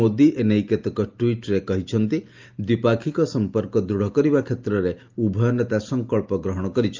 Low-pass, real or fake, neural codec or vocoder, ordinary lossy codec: 7.2 kHz; real; none; Opus, 24 kbps